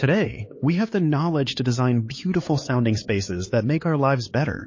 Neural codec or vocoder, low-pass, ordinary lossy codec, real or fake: codec, 16 kHz, 4 kbps, X-Codec, HuBERT features, trained on LibriSpeech; 7.2 kHz; MP3, 32 kbps; fake